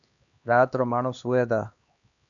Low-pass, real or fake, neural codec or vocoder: 7.2 kHz; fake; codec, 16 kHz, 2 kbps, X-Codec, HuBERT features, trained on LibriSpeech